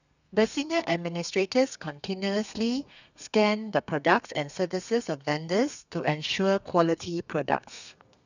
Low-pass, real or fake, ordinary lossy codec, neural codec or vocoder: 7.2 kHz; fake; none; codec, 32 kHz, 1.9 kbps, SNAC